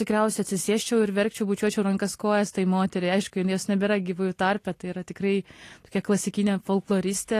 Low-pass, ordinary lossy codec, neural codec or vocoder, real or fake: 14.4 kHz; AAC, 48 kbps; none; real